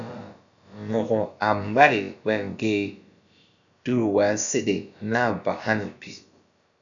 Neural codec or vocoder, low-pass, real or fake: codec, 16 kHz, about 1 kbps, DyCAST, with the encoder's durations; 7.2 kHz; fake